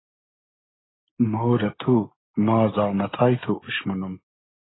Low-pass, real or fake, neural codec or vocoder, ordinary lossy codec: 7.2 kHz; real; none; AAC, 16 kbps